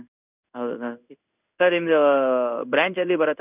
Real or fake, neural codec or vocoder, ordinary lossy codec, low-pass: fake; codec, 16 kHz in and 24 kHz out, 1 kbps, XY-Tokenizer; none; 3.6 kHz